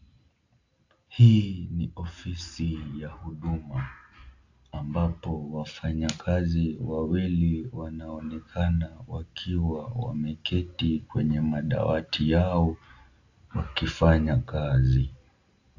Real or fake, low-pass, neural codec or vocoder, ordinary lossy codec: real; 7.2 kHz; none; AAC, 48 kbps